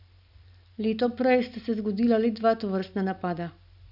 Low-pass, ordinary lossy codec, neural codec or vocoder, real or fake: 5.4 kHz; none; none; real